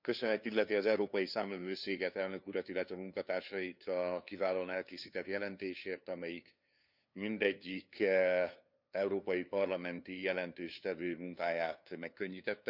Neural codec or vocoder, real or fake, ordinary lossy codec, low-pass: codec, 16 kHz, 2 kbps, FunCodec, trained on LibriTTS, 25 frames a second; fake; none; 5.4 kHz